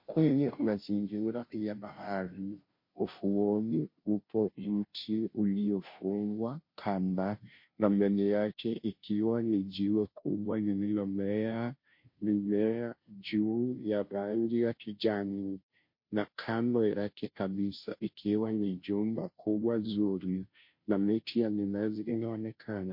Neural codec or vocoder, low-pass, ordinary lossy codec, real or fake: codec, 16 kHz, 0.5 kbps, FunCodec, trained on Chinese and English, 25 frames a second; 5.4 kHz; MP3, 32 kbps; fake